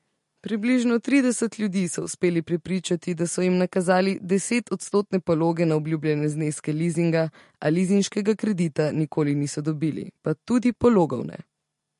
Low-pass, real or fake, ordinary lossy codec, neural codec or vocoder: 14.4 kHz; real; MP3, 48 kbps; none